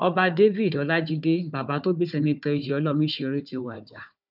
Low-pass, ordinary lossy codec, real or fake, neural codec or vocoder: 5.4 kHz; none; fake; codec, 16 kHz, 4 kbps, FunCodec, trained on Chinese and English, 50 frames a second